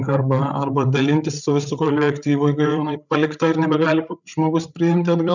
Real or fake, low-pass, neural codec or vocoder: fake; 7.2 kHz; codec, 16 kHz, 8 kbps, FreqCodec, larger model